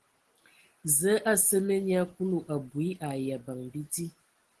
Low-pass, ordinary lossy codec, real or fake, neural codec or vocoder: 10.8 kHz; Opus, 16 kbps; real; none